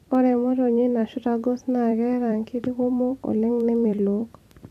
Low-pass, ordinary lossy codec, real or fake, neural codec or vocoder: 14.4 kHz; none; fake; vocoder, 44.1 kHz, 128 mel bands every 256 samples, BigVGAN v2